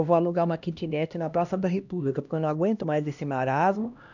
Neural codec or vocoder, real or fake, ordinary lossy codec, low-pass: codec, 16 kHz, 1 kbps, X-Codec, HuBERT features, trained on LibriSpeech; fake; none; 7.2 kHz